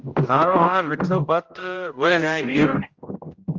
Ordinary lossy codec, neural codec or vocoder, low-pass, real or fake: Opus, 24 kbps; codec, 16 kHz, 0.5 kbps, X-Codec, HuBERT features, trained on general audio; 7.2 kHz; fake